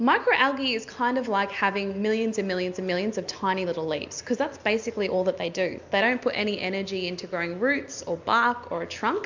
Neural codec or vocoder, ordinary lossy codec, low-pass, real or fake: none; MP3, 64 kbps; 7.2 kHz; real